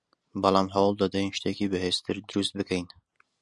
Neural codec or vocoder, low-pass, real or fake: none; 10.8 kHz; real